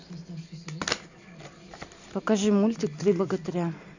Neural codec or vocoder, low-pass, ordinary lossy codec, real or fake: none; 7.2 kHz; none; real